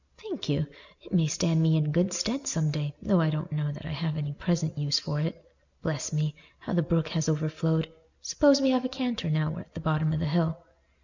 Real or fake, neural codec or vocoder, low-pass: real; none; 7.2 kHz